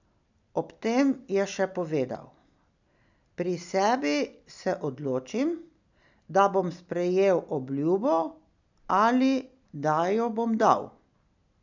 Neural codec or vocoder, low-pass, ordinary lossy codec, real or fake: none; 7.2 kHz; none; real